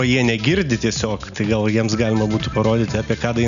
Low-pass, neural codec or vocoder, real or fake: 7.2 kHz; none; real